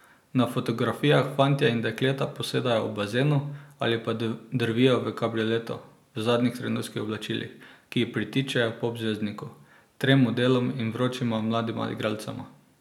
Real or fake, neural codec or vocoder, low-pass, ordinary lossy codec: real; none; 19.8 kHz; none